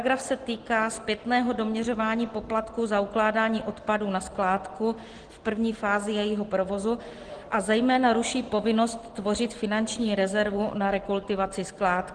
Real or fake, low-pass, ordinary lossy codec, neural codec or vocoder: real; 9.9 kHz; Opus, 16 kbps; none